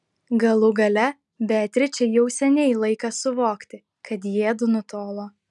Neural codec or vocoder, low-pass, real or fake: none; 10.8 kHz; real